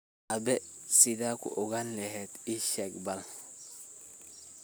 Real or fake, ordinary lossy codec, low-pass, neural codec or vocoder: real; none; none; none